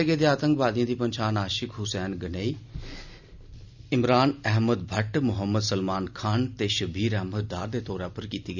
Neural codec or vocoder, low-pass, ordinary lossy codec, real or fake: none; 7.2 kHz; none; real